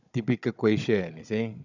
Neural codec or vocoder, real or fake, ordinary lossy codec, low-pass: codec, 16 kHz, 16 kbps, FunCodec, trained on Chinese and English, 50 frames a second; fake; none; 7.2 kHz